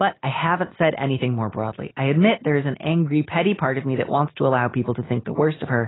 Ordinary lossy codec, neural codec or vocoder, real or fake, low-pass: AAC, 16 kbps; none; real; 7.2 kHz